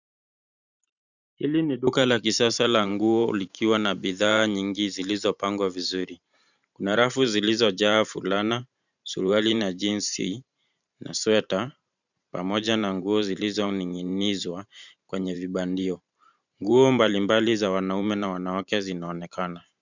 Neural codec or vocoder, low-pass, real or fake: vocoder, 44.1 kHz, 128 mel bands every 512 samples, BigVGAN v2; 7.2 kHz; fake